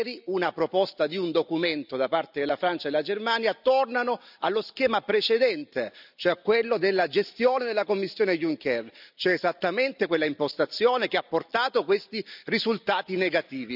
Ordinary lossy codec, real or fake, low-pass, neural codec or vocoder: none; real; 5.4 kHz; none